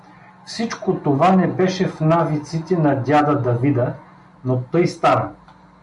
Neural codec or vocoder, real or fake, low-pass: none; real; 10.8 kHz